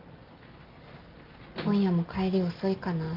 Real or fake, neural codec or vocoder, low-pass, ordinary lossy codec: real; none; 5.4 kHz; Opus, 24 kbps